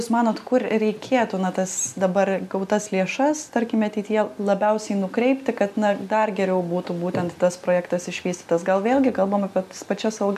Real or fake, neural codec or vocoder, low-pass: real; none; 14.4 kHz